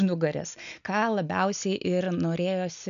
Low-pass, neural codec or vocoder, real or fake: 7.2 kHz; none; real